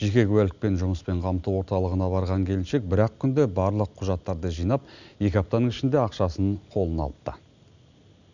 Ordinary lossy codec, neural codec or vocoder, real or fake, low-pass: none; none; real; 7.2 kHz